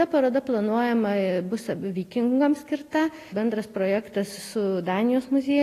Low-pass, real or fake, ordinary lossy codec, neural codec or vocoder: 14.4 kHz; real; AAC, 48 kbps; none